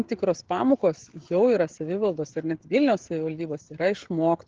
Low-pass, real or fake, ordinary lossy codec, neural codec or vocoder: 7.2 kHz; fake; Opus, 16 kbps; codec, 16 kHz, 16 kbps, FreqCodec, smaller model